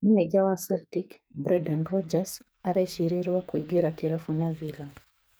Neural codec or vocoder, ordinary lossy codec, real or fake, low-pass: codec, 44.1 kHz, 2.6 kbps, SNAC; none; fake; none